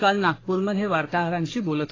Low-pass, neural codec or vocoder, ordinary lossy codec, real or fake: 7.2 kHz; codec, 44.1 kHz, 3.4 kbps, Pupu-Codec; AAC, 32 kbps; fake